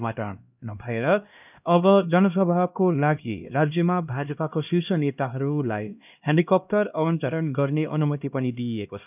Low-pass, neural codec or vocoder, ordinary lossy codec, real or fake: 3.6 kHz; codec, 16 kHz, 1 kbps, X-Codec, HuBERT features, trained on LibriSpeech; none; fake